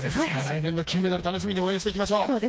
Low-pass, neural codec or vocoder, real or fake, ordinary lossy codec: none; codec, 16 kHz, 2 kbps, FreqCodec, smaller model; fake; none